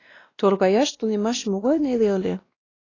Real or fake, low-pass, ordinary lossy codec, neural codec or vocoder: fake; 7.2 kHz; AAC, 32 kbps; codec, 16 kHz, 1 kbps, X-Codec, WavLM features, trained on Multilingual LibriSpeech